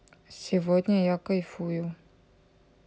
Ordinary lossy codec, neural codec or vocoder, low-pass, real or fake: none; none; none; real